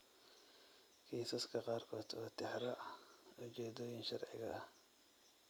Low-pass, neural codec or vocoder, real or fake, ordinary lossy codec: none; none; real; none